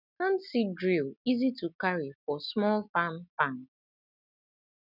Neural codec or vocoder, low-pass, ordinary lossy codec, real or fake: none; 5.4 kHz; none; real